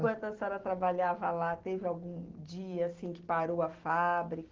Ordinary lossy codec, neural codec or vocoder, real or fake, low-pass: Opus, 16 kbps; none; real; 7.2 kHz